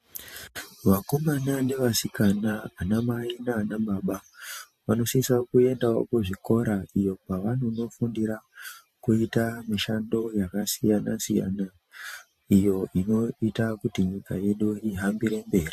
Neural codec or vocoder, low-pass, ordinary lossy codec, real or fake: vocoder, 44.1 kHz, 128 mel bands every 512 samples, BigVGAN v2; 14.4 kHz; MP3, 64 kbps; fake